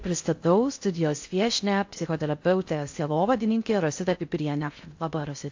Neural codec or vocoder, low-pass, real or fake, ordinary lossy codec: codec, 16 kHz in and 24 kHz out, 0.6 kbps, FocalCodec, streaming, 4096 codes; 7.2 kHz; fake; AAC, 48 kbps